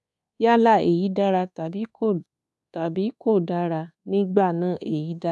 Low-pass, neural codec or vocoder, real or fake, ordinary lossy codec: none; codec, 24 kHz, 1.2 kbps, DualCodec; fake; none